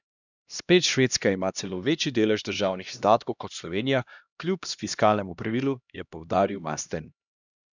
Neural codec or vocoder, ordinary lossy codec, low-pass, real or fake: codec, 16 kHz, 1 kbps, X-Codec, HuBERT features, trained on LibriSpeech; none; 7.2 kHz; fake